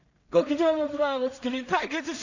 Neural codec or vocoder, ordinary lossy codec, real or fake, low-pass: codec, 16 kHz in and 24 kHz out, 0.4 kbps, LongCat-Audio-Codec, two codebook decoder; none; fake; 7.2 kHz